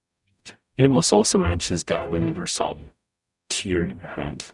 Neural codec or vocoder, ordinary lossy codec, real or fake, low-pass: codec, 44.1 kHz, 0.9 kbps, DAC; none; fake; 10.8 kHz